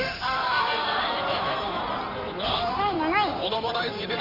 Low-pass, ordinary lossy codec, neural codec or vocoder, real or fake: 5.4 kHz; none; codec, 16 kHz in and 24 kHz out, 2.2 kbps, FireRedTTS-2 codec; fake